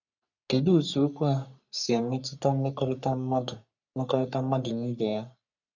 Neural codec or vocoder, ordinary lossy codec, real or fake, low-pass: codec, 44.1 kHz, 3.4 kbps, Pupu-Codec; none; fake; 7.2 kHz